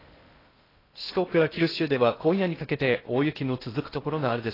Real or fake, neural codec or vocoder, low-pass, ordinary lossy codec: fake; codec, 16 kHz in and 24 kHz out, 0.6 kbps, FocalCodec, streaming, 2048 codes; 5.4 kHz; AAC, 24 kbps